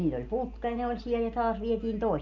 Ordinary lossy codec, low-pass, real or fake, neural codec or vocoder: none; 7.2 kHz; real; none